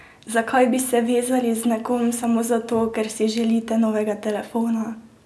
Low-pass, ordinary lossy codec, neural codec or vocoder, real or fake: none; none; none; real